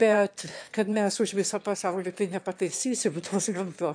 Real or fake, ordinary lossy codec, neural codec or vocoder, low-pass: fake; AAC, 64 kbps; autoencoder, 22.05 kHz, a latent of 192 numbers a frame, VITS, trained on one speaker; 9.9 kHz